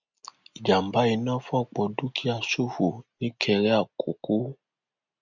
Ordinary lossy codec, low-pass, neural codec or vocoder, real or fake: none; 7.2 kHz; none; real